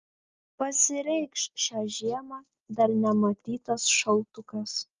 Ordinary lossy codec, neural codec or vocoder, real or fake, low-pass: Opus, 32 kbps; none; real; 7.2 kHz